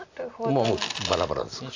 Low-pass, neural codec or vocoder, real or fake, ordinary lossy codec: 7.2 kHz; none; real; AAC, 48 kbps